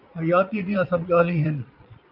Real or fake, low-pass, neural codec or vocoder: fake; 5.4 kHz; vocoder, 22.05 kHz, 80 mel bands, Vocos